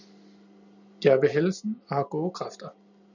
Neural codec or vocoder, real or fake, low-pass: none; real; 7.2 kHz